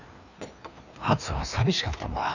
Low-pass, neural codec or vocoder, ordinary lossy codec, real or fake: 7.2 kHz; codec, 16 kHz, 2 kbps, FreqCodec, larger model; none; fake